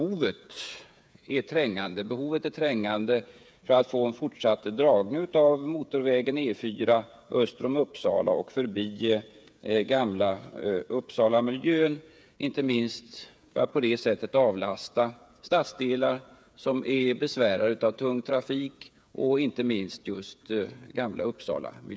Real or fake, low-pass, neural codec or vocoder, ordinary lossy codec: fake; none; codec, 16 kHz, 8 kbps, FreqCodec, smaller model; none